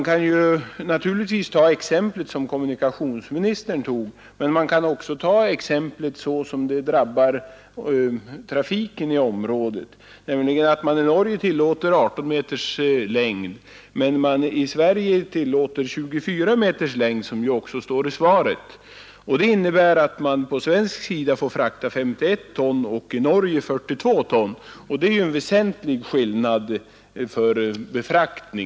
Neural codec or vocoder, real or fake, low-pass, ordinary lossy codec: none; real; none; none